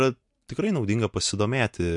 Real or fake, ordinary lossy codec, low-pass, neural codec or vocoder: real; MP3, 64 kbps; 10.8 kHz; none